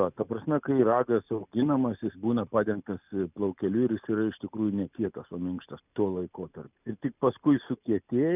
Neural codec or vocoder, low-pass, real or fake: none; 3.6 kHz; real